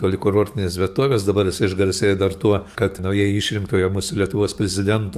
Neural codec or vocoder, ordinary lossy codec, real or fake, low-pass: codec, 44.1 kHz, 7.8 kbps, DAC; AAC, 96 kbps; fake; 14.4 kHz